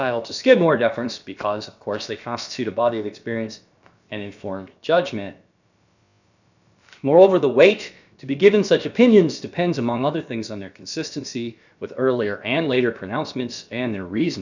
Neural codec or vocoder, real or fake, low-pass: codec, 16 kHz, about 1 kbps, DyCAST, with the encoder's durations; fake; 7.2 kHz